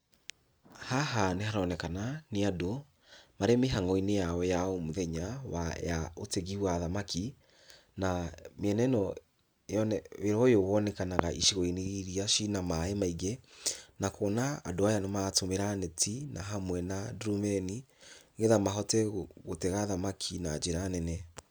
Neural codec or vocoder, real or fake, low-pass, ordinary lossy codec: none; real; none; none